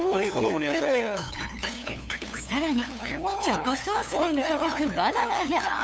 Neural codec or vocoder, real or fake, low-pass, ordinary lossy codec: codec, 16 kHz, 2 kbps, FunCodec, trained on LibriTTS, 25 frames a second; fake; none; none